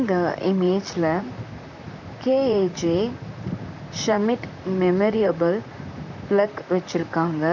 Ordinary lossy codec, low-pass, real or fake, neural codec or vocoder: none; 7.2 kHz; fake; vocoder, 44.1 kHz, 128 mel bands, Pupu-Vocoder